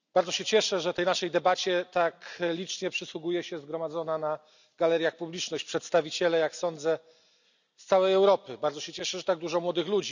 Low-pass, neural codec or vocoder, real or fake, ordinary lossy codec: 7.2 kHz; none; real; none